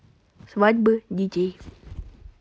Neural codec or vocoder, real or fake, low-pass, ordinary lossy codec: none; real; none; none